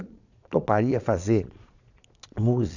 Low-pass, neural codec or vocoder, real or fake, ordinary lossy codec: 7.2 kHz; vocoder, 22.05 kHz, 80 mel bands, Vocos; fake; none